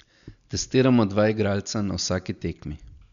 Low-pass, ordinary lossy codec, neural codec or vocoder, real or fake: 7.2 kHz; none; none; real